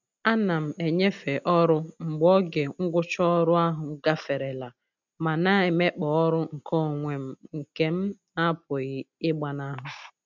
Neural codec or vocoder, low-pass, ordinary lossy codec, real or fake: none; 7.2 kHz; none; real